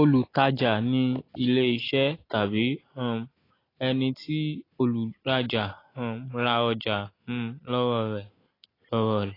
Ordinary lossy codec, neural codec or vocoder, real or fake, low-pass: AAC, 24 kbps; none; real; 5.4 kHz